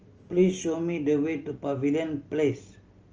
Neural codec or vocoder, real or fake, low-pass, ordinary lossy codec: none; real; 7.2 kHz; Opus, 24 kbps